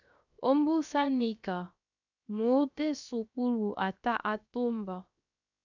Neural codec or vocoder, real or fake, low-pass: codec, 16 kHz, 0.7 kbps, FocalCodec; fake; 7.2 kHz